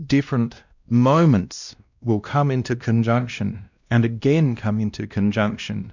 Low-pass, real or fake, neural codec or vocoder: 7.2 kHz; fake; codec, 16 kHz, 1 kbps, X-Codec, WavLM features, trained on Multilingual LibriSpeech